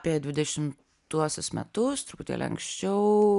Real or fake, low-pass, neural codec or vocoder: real; 10.8 kHz; none